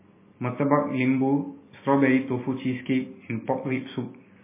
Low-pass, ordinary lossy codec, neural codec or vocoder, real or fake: 3.6 kHz; MP3, 16 kbps; none; real